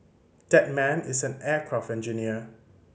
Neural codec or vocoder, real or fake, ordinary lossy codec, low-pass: none; real; none; none